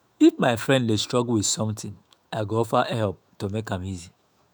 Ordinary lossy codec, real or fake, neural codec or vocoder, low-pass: none; fake; autoencoder, 48 kHz, 128 numbers a frame, DAC-VAE, trained on Japanese speech; none